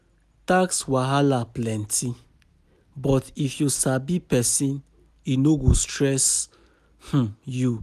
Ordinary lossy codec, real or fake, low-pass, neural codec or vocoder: none; real; 14.4 kHz; none